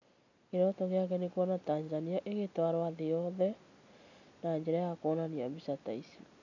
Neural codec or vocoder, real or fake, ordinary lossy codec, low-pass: none; real; none; 7.2 kHz